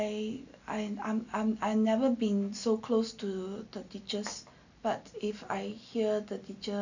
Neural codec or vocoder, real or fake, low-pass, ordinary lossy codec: none; real; 7.2 kHz; none